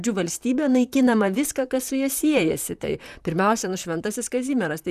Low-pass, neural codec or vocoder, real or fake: 14.4 kHz; vocoder, 44.1 kHz, 128 mel bands, Pupu-Vocoder; fake